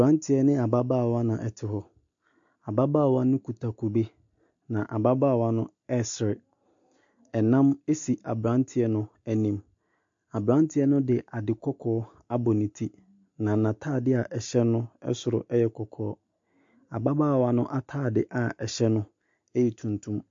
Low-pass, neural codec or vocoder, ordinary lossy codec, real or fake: 7.2 kHz; none; MP3, 48 kbps; real